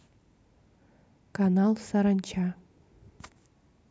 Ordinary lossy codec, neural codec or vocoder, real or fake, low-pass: none; none; real; none